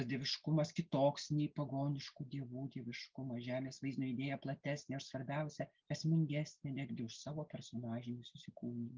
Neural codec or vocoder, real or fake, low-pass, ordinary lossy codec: none; real; 7.2 kHz; Opus, 24 kbps